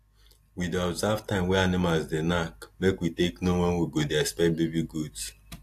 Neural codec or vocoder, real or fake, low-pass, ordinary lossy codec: none; real; 14.4 kHz; AAC, 64 kbps